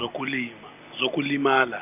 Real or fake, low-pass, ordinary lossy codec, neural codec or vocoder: real; 3.6 kHz; none; none